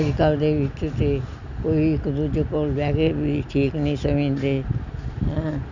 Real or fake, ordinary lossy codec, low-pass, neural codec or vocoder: real; none; 7.2 kHz; none